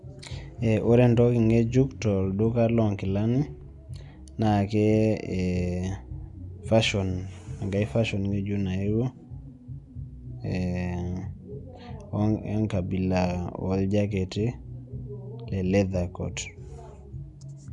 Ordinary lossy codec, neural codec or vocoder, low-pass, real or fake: none; none; 10.8 kHz; real